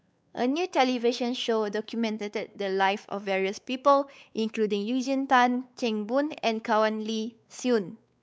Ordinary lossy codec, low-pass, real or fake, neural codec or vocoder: none; none; fake; codec, 16 kHz, 4 kbps, X-Codec, WavLM features, trained on Multilingual LibriSpeech